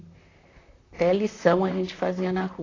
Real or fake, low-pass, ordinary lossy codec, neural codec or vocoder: fake; 7.2 kHz; AAC, 32 kbps; vocoder, 44.1 kHz, 128 mel bands, Pupu-Vocoder